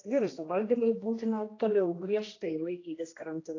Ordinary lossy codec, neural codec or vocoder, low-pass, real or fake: AAC, 32 kbps; codec, 16 kHz, 1 kbps, X-Codec, HuBERT features, trained on general audio; 7.2 kHz; fake